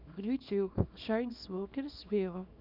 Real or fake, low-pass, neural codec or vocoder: fake; 5.4 kHz; codec, 24 kHz, 0.9 kbps, WavTokenizer, small release